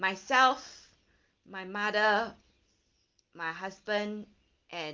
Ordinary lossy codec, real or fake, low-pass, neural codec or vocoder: Opus, 32 kbps; real; 7.2 kHz; none